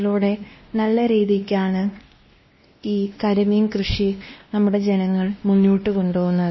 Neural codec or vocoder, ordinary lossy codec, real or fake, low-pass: codec, 16 kHz, 1 kbps, X-Codec, WavLM features, trained on Multilingual LibriSpeech; MP3, 24 kbps; fake; 7.2 kHz